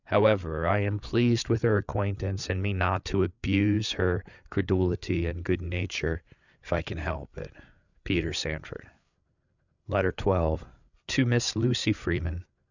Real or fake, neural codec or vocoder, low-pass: fake; codec, 16 kHz, 8 kbps, FreqCodec, larger model; 7.2 kHz